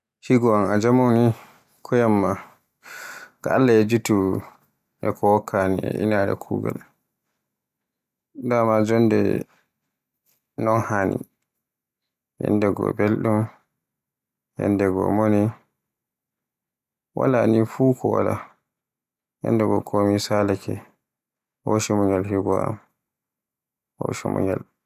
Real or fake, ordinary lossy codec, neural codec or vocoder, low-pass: real; none; none; 14.4 kHz